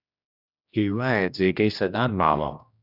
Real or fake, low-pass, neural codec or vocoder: fake; 5.4 kHz; codec, 16 kHz, 1 kbps, X-Codec, HuBERT features, trained on general audio